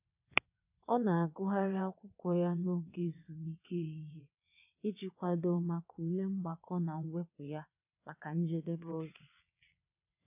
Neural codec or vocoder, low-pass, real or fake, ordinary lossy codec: vocoder, 22.05 kHz, 80 mel bands, WaveNeXt; 3.6 kHz; fake; none